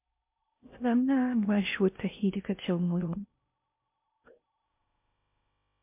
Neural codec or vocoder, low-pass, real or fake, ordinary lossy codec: codec, 16 kHz in and 24 kHz out, 0.6 kbps, FocalCodec, streaming, 4096 codes; 3.6 kHz; fake; MP3, 32 kbps